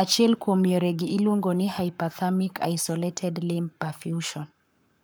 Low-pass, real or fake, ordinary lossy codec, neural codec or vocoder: none; fake; none; codec, 44.1 kHz, 7.8 kbps, Pupu-Codec